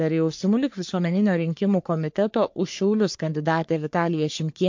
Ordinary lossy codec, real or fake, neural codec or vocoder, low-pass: MP3, 48 kbps; fake; codec, 44.1 kHz, 3.4 kbps, Pupu-Codec; 7.2 kHz